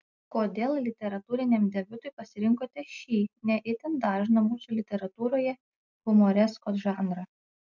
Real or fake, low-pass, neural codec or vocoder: real; 7.2 kHz; none